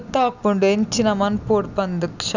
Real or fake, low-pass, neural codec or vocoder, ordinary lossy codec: real; 7.2 kHz; none; none